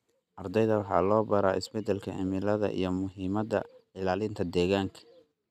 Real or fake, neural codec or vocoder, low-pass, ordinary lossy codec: real; none; 14.4 kHz; none